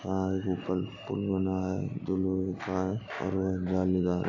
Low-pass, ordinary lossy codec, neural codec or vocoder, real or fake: 7.2 kHz; none; none; real